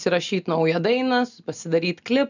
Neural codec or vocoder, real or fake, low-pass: none; real; 7.2 kHz